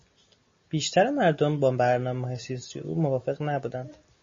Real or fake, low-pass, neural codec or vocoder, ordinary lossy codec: real; 7.2 kHz; none; MP3, 32 kbps